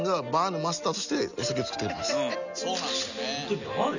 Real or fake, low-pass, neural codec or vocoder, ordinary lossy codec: real; 7.2 kHz; none; none